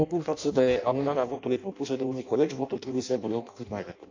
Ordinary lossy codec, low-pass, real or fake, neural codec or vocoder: MP3, 64 kbps; 7.2 kHz; fake; codec, 16 kHz in and 24 kHz out, 0.6 kbps, FireRedTTS-2 codec